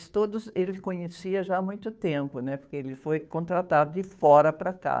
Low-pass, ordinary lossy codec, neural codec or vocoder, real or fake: none; none; codec, 16 kHz, 2 kbps, FunCodec, trained on Chinese and English, 25 frames a second; fake